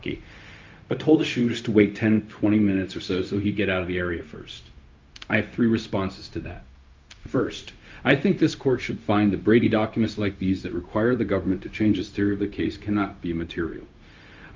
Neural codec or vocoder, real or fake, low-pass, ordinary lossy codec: codec, 16 kHz, 0.4 kbps, LongCat-Audio-Codec; fake; 7.2 kHz; Opus, 32 kbps